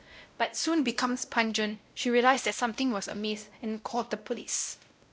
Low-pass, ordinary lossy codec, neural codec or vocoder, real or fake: none; none; codec, 16 kHz, 0.5 kbps, X-Codec, WavLM features, trained on Multilingual LibriSpeech; fake